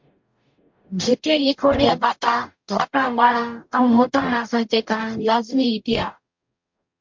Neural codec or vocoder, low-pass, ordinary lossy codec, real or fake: codec, 44.1 kHz, 0.9 kbps, DAC; 7.2 kHz; MP3, 48 kbps; fake